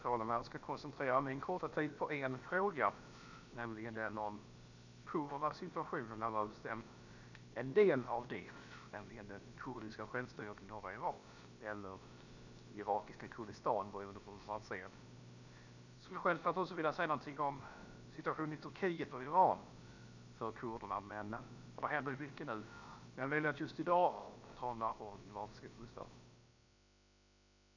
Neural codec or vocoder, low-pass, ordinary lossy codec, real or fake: codec, 16 kHz, about 1 kbps, DyCAST, with the encoder's durations; 7.2 kHz; none; fake